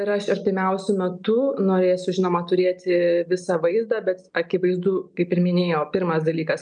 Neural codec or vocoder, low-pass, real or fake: none; 9.9 kHz; real